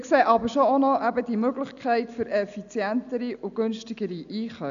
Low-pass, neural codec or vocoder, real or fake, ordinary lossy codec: 7.2 kHz; none; real; none